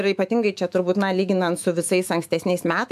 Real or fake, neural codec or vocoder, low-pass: fake; autoencoder, 48 kHz, 128 numbers a frame, DAC-VAE, trained on Japanese speech; 14.4 kHz